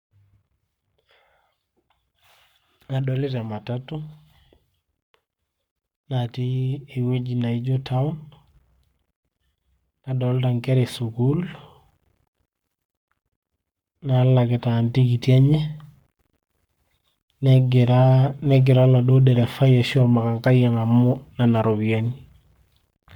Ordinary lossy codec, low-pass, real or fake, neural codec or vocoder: MP3, 96 kbps; 19.8 kHz; fake; codec, 44.1 kHz, 7.8 kbps, Pupu-Codec